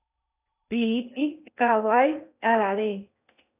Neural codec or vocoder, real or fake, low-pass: codec, 16 kHz in and 24 kHz out, 0.8 kbps, FocalCodec, streaming, 65536 codes; fake; 3.6 kHz